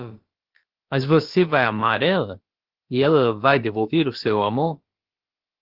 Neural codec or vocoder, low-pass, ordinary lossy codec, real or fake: codec, 16 kHz, about 1 kbps, DyCAST, with the encoder's durations; 5.4 kHz; Opus, 16 kbps; fake